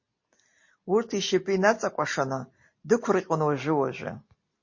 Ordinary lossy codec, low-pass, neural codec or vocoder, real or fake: MP3, 32 kbps; 7.2 kHz; none; real